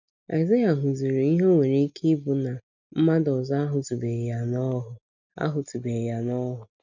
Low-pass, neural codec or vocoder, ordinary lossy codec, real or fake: 7.2 kHz; none; none; real